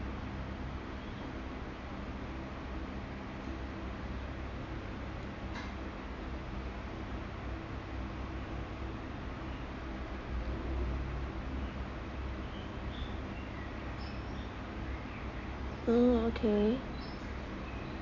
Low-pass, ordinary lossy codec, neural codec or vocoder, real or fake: 7.2 kHz; MP3, 48 kbps; none; real